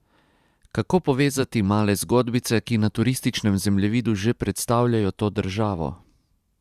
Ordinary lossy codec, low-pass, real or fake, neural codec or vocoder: Opus, 64 kbps; 14.4 kHz; fake; vocoder, 44.1 kHz, 128 mel bands every 512 samples, BigVGAN v2